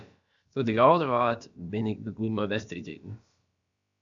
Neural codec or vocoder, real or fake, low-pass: codec, 16 kHz, about 1 kbps, DyCAST, with the encoder's durations; fake; 7.2 kHz